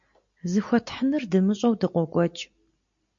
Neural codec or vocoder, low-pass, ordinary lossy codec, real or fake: none; 7.2 kHz; MP3, 48 kbps; real